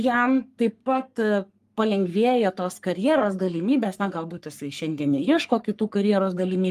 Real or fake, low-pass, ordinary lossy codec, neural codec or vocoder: fake; 14.4 kHz; Opus, 32 kbps; codec, 44.1 kHz, 3.4 kbps, Pupu-Codec